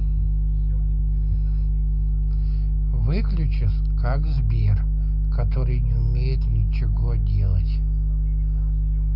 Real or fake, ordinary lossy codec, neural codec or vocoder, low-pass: real; none; none; 5.4 kHz